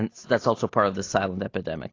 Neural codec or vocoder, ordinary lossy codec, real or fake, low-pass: none; AAC, 32 kbps; real; 7.2 kHz